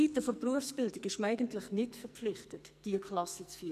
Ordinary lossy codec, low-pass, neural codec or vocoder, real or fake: none; 14.4 kHz; codec, 44.1 kHz, 2.6 kbps, SNAC; fake